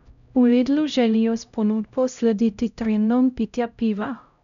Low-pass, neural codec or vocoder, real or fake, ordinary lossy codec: 7.2 kHz; codec, 16 kHz, 0.5 kbps, X-Codec, HuBERT features, trained on LibriSpeech; fake; none